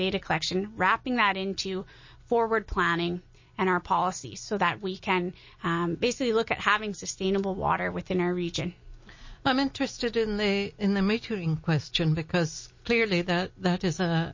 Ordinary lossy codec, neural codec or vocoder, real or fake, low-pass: MP3, 32 kbps; none; real; 7.2 kHz